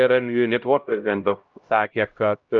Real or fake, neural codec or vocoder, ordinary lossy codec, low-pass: fake; codec, 16 kHz, 0.5 kbps, X-Codec, HuBERT features, trained on LibriSpeech; Opus, 24 kbps; 7.2 kHz